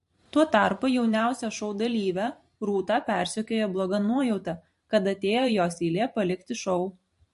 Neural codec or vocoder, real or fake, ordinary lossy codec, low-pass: vocoder, 44.1 kHz, 128 mel bands every 512 samples, BigVGAN v2; fake; MP3, 48 kbps; 14.4 kHz